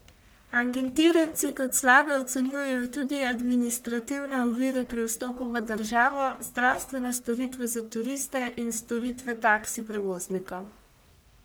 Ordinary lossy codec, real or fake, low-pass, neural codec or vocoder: none; fake; none; codec, 44.1 kHz, 1.7 kbps, Pupu-Codec